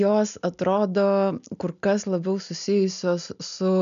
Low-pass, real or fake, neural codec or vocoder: 7.2 kHz; real; none